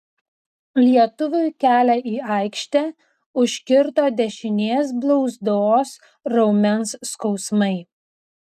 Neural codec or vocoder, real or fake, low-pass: none; real; 14.4 kHz